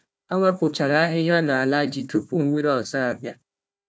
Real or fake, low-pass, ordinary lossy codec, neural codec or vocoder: fake; none; none; codec, 16 kHz, 1 kbps, FunCodec, trained on Chinese and English, 50 frames a second